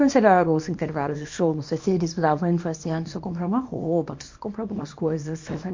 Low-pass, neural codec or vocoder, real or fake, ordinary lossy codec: 7.2 kHz; codec, 24 kHz, 0.9 kbps, WavTokenizer, small release; fake; MP3, 48 kbps